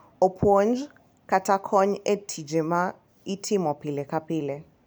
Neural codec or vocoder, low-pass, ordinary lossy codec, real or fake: none; none; none; real